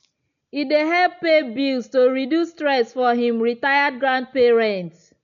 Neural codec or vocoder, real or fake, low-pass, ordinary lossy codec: none; real; 7.2 kHz; none